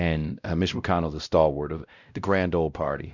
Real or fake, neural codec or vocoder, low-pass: fake; codec, 16 kHz, 0.5 kbps, X-Codec, WavLM features, trained on Multilingual LibriSpeech; 7.2 kHz